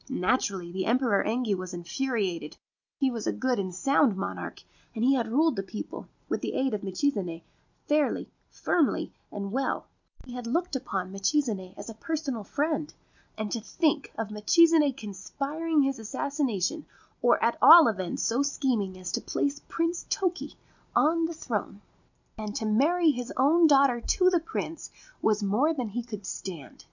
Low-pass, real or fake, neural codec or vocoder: 7.2 kHz; real; none